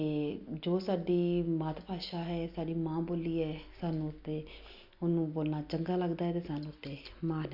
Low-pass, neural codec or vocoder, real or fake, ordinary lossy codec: 5.4 kHz; none; real; none